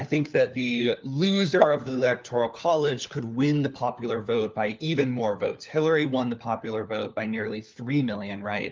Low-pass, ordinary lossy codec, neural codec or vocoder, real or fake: 7.2 kHz; Opus, 24 kbps; codec, 16 kHz, 4 kbps, FunCodec, trained on LibriTTS, 50 frames a second; fake